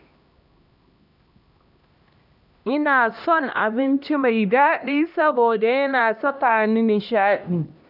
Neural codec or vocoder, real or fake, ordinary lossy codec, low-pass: codec, 16 kHz, 1 kbps, X-Codec, HuBERT features, trained on LibriSpeech; fake; none; 5.4 kHz